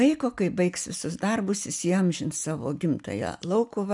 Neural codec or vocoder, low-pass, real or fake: none; 10.8 kHz; real